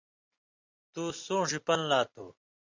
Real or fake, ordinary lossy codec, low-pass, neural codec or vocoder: fake; MP3, 64 kbps; 7.2 kHz; vocoder, 44.1 kHz, 128 mel bands every 256 samples, BigVGAN v2